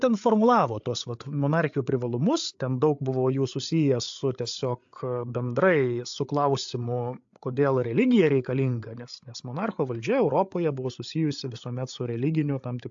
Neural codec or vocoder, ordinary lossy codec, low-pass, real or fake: codec, 16 kHz, 8 kbps, FreqCodec, larger model; AAC, 64 kbps; 7.2 kHz; fake